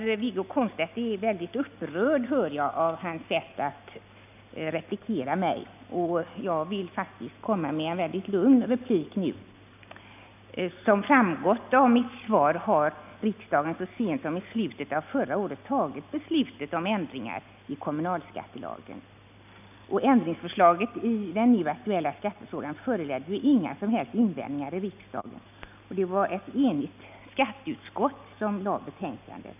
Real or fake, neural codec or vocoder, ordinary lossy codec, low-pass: real; none; none; 3.6 kHz